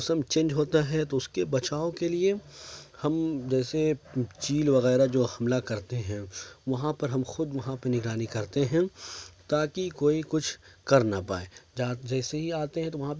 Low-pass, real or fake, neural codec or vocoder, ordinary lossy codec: none; real; none; none